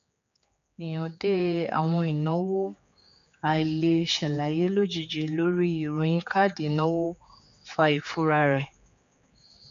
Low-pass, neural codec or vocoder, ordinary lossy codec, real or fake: 7.2 kHz; codec, 16 kHz, 4 kbps, X-Codec, HuBERT features, trained on general audio; MP3, 48 kbps; fake